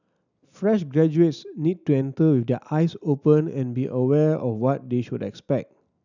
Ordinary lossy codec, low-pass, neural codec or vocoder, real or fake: none; 7.2 kHz; none; real